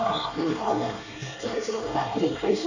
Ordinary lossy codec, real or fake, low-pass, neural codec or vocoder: none; fake; 7.2 kHz; codec, 24 kHz, 1 kbps, SNAC